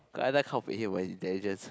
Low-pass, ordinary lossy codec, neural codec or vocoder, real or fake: none; none; none; real